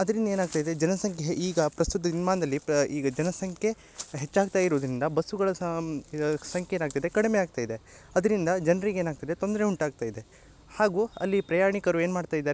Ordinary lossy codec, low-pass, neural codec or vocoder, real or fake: none; none; none; real